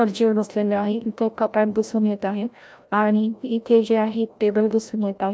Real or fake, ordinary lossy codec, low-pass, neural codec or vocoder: fake; none; none; codec, 16 kHz, 0.5 kbps, FreqCodec, larger model